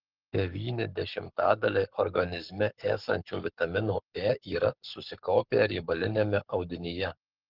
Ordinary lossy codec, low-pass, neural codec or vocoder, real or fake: Opus, 16 kbps; 5.4 kHz; codec, 44.1 kHz, 7.8 kbps, Pupu-Codec; fake